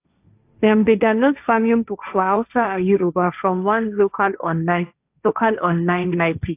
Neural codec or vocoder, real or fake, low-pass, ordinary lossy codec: codec, 16 kHz, 1.1 kbps, Voila-Tokenizer; fake; 3.6 kHz; AAC, 32 kbps